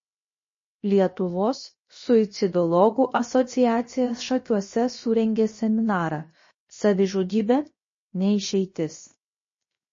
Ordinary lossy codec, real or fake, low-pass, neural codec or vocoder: MP3, 32 kbps; fake; 7.2 kHz; codec, 16 kHz, 0.7 kbps, FocalCodec